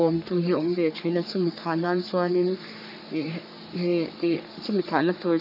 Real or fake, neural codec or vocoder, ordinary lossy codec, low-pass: fake; codec, 44.1 kHz, 3.4 kbps, Pupu-Codec; none; 5.4 kHz